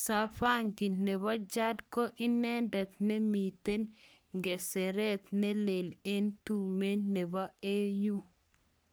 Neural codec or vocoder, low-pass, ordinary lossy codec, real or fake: codec, 44.1 kHz, 3.4 kbps, Pupu-Codec; none; none; fake